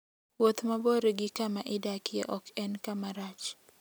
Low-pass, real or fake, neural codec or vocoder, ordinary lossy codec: none; real; none; none